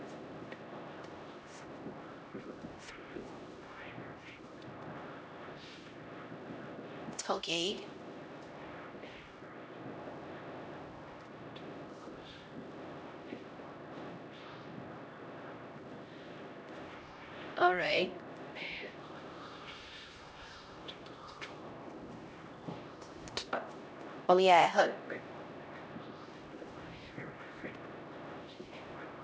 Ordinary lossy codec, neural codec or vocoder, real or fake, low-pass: none; codec, 16 kHz, 0.5 kbps, X-Codec, HuBERT features, trained on LibriSpeech; fake; none